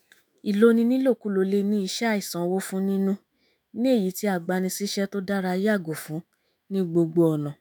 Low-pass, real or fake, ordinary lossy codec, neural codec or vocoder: none; fake; none; autoencoder, 48 kHz, 128 numbers a frame, DAC-VAE, trained on Japanese speech